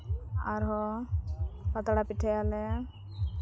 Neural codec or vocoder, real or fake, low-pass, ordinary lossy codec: none; real; none; none